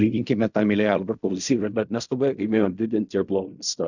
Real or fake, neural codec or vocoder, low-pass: fake; codec, 16 kHz in and 24 kHz out, 0.4 kbps, LongCat-Audio-Codec, fine tuned four codebook decoder; 7.2 kHz